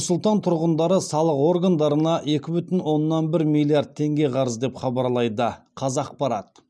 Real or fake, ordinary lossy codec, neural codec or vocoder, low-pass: real; none; none; none